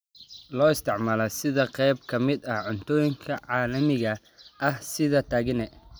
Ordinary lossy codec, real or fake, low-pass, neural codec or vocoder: none; real; none; none